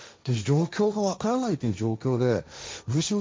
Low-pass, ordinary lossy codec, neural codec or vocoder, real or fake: none; none; codec, 16 kHz, 1.1 kbps, Voila-Tokenizer; fake